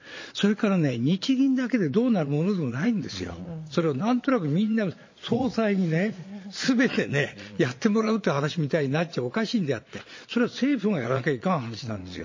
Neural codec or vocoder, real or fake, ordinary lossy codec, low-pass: vocoder, 22.05 kHz, 80 mel bands, Vocos; fake; MP3, 32 kbps; 7.2 kHz